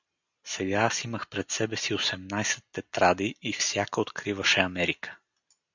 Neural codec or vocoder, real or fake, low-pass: none; real; 7.2 kHz